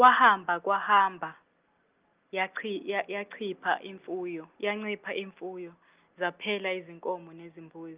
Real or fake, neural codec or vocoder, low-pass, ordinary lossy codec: real; none; 3.6 kHz; Opus, 24 kbps